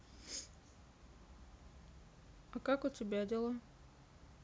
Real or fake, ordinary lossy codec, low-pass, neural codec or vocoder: real; none; none; none